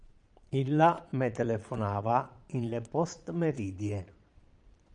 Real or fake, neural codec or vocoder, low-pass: fake; vocoder, 22.05 kHz, 80 mel bands, Vocos; 9.9 kHz